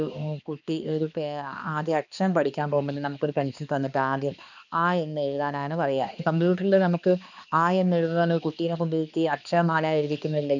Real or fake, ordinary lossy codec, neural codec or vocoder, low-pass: fake; none; codec, 16 kHz, 2 kbps, X-Codec, HuBERT features, trained on balanced general audio; 7.2 kHz